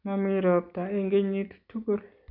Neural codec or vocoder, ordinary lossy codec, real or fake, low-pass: none; none; real; 5.4 kHz